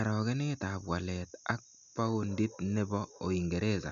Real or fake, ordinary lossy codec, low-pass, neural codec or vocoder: real; none; 7.2 kHz; none